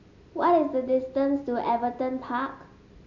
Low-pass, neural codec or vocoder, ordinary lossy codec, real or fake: 7.2 kHz; none; none; real